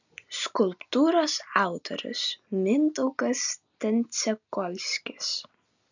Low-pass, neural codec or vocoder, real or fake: 7.2 kHz; none; real